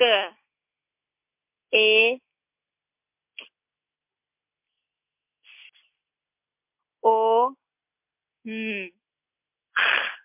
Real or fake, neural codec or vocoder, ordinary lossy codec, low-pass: real; none; MP3, 32 kbps; 3.6 kHz